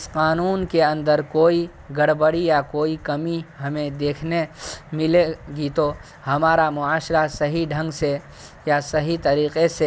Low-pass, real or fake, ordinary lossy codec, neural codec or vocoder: none; real; none; none